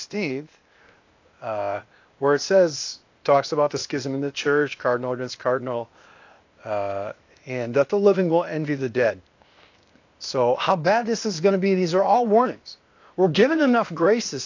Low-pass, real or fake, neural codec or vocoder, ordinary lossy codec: 7.2 kHz; fake; codec, 16 kHz, 0.8 kbps, ZipCodec; AAC, 48 kbps